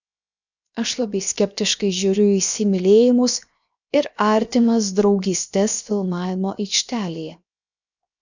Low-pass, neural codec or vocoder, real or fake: 7.2 kHz; codec, 16 kHz, 0.7 kbps, FocalCodec; fake